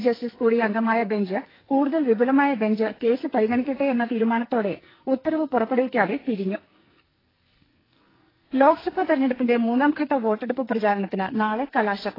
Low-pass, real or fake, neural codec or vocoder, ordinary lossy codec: 5.4 kHz; fake; codec, 44.1 kHz, 2.6 kbps, SNAC; AAC, 24 kbps